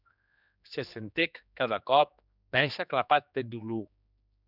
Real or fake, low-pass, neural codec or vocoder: fake; 5.4 kHz; codec, 16 kHz, 2 kbps, X-Codec, HuBERT features, trained on general audio